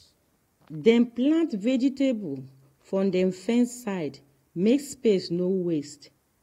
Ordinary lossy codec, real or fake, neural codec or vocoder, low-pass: AAC, 48 kbps; real; none; 19.8 kHz